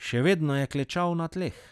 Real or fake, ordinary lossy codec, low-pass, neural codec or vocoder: real; none; none; none